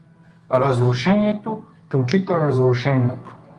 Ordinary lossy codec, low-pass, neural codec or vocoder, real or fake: Opus, 32 kbps; 10.8 kHz; codec, 24 kHz, 0.9 kbps, WavTokenizer, medium music audio release; fake